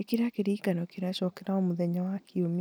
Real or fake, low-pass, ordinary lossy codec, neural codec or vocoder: real; none; none; none